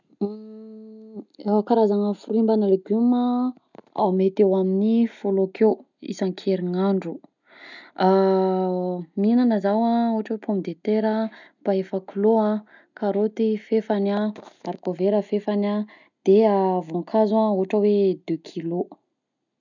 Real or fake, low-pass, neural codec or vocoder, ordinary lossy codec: real; 7.2 kHz; none; none